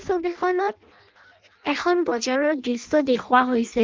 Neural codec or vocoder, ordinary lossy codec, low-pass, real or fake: codec, 16 kHz in and 24 kHz out, 0.6 kbps, FireRedTTS-2 codec; Opus, 32 kbps; 7.2 kHz; fake